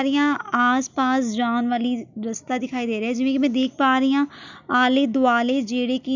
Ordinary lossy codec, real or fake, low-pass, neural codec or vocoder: none; real; 7.2 kHz; none